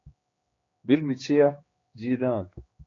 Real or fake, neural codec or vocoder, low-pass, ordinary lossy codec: fake; codec, 16 kHz, 2 kbps, X-Codec, HuBERT features, trained on general audio; 7.2 kHz; AAC, 32 kbps